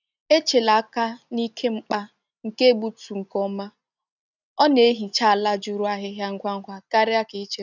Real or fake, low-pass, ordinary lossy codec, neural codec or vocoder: real; 7.2 kHz; none; none